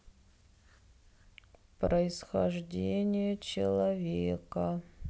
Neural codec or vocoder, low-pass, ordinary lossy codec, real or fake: none; none; none; real